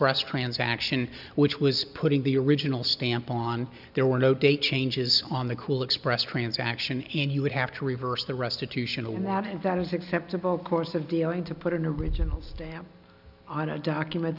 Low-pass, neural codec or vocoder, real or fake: 5.4 kHz; none; real